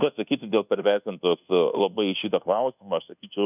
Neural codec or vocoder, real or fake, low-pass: codec, 24 kHz, 1.2 kbps, DualCodec; fake; 3.6 kHz